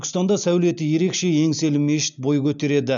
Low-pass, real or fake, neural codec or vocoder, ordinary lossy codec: 7.2 kHz; real; none; none